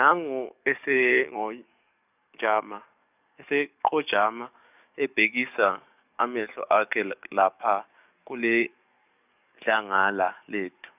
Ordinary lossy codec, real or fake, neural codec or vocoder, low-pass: none; fake; codec, 44.1 kHz, 7.8 kbps, DAC; 3.6 kHz